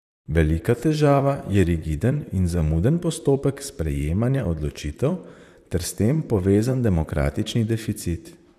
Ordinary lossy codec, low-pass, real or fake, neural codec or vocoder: none; 14.4 kHz; fake; vocoder, 44.1 kHz, 128 mel bands, Pupu-Vocoder